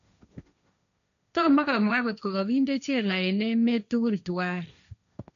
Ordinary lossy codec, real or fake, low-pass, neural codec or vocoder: none; fake; 7.2 kHz; codec, 16 kHz, 1.1 kbps, Voila-Tokenizer